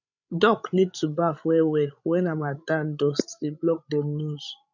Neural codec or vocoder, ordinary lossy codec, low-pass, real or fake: codec, 16 kHz, 16 kbps, FreqCodec, larger model; none; 7.2 kHz; fake